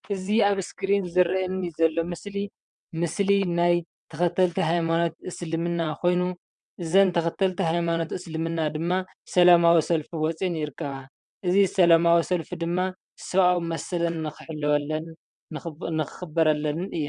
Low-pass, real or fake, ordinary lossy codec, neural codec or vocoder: 9.9 kHz; fake; MP3, 96 kbps; vocoder, 22.05 kHz, 80 mel bands, WaveNeXt